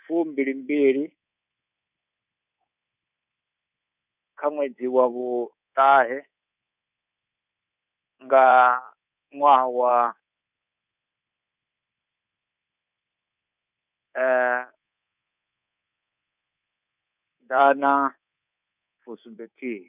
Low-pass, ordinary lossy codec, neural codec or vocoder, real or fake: 3.6 kHz; none; codec, 24 kHz, 3.1 kbps, DualCodec; fake